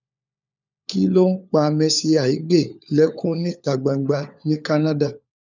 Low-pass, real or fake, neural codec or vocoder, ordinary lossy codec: 7.2 kHz; fake; codec, 16 kHz, 4 kbps, FunCodec, trained on LibriTTS, 50 frames a second; none